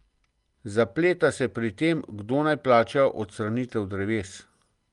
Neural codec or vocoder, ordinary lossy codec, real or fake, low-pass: none; Opus, 32 kbps; real; 10.8 kHz